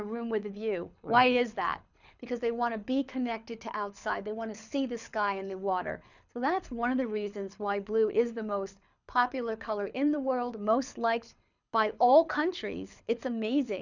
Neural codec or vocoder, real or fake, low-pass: codec, 24 kHz, 6 kbps, HILCodec; fake; 7.2 kHz